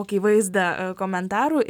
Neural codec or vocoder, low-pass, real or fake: none; 19.8 kHz; real